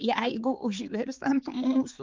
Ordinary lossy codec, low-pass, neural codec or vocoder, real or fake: Opus, 32 kbps; 7.2 kHz; codec, 16 kHz, 4 kbps, X-Codec, HuBERT features, trained on balanced general audio; fake